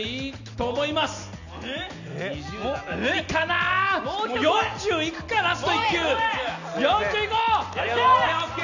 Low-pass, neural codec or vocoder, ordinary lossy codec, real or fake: 7.2 kHz; none; none; real